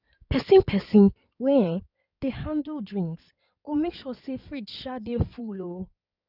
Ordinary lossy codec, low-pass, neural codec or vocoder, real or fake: none; 5.4 kHz; codec, 16 kHz in and 24 kHz out, 2.2 kbps, FireRedTTS-2 codec; fake